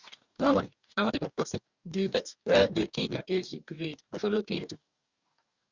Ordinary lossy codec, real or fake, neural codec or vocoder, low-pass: Opus, 64 kbps; fake; codec, 44.1 kHz, 2.6 kbps, DAC; 7.2 kHz